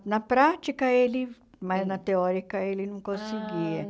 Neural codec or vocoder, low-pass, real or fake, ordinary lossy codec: none; none; real; none